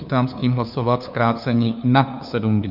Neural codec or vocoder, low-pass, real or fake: codec, 16 kHz, 2 kbps, FunCodec, trained on LibriTTS, 25 frames a second; 5.4 kHz; fake